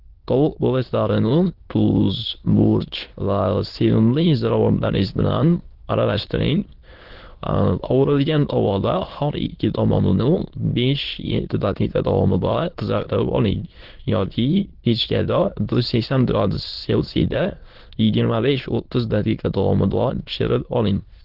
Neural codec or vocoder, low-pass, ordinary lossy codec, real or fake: autoencoder, 22.05 kHz, a latent of 192 numbers a frame, VITS, trained on many speakers; 5.4 kHz; Opus, 16 kbps; fake